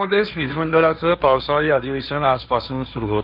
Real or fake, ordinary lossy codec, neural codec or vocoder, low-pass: fake; none; codec, 16 kHz, 1.1 kbps, Voila-Tokenizer; 5.4 kHz